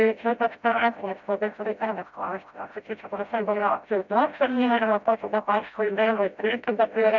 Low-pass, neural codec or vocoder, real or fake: 7.2 kHz; codec, 16 kHz, 0.5 kbps, FreqCodec, smaller model; fake